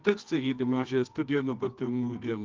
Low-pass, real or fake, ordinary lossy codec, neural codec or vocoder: 7.2 kHz; fake; Opus, 32 kbps; codec, 24 kHz, 0.9 kbps, WavTokenizer, medium music audio release